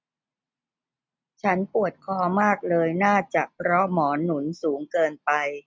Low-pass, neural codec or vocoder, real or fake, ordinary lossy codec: none; none; real; none